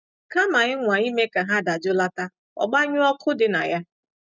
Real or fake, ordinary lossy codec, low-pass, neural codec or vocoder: real; none; 7.2 kHz; none